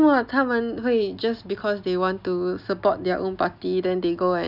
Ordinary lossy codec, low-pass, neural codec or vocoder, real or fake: none; 5.4 kHz; none; real